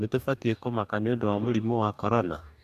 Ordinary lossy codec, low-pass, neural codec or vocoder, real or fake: none; 14.4 kHz; codec, 44.1 kHz, 2.6 kbps, DAC; fake